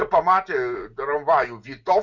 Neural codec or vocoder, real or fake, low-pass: none; real; 7.2 kHz